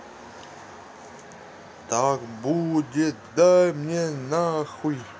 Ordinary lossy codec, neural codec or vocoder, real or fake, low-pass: none; none; real; none